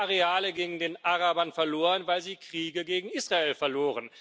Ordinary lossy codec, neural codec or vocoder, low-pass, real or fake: none; none; none; real